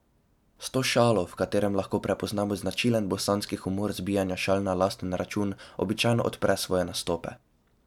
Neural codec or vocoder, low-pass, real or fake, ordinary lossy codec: none; 19.8 kHz; real; none